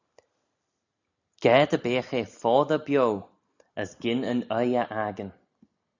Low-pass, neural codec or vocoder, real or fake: 7.2 kHz; none; real